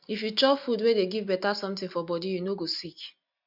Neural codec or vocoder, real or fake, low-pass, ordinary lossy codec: none; real; 5.4 kHz; none